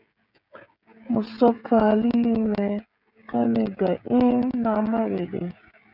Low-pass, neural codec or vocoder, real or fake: 5.4 kHz; codec, 16 kHz, 16 kbps, FreqCodec, smaller model; fake